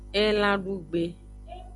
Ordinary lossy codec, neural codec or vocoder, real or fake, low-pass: MP3, 96 kbps; none; real; 10.8 kHz